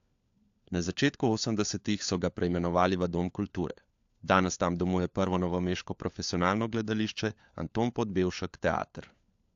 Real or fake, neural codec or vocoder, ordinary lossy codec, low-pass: fake; codec, 16 kHz, 4 kbps, FunCodec, trained on LibriTTS, 50 frames a second; AAC, 64 kbps; 7.2 kHz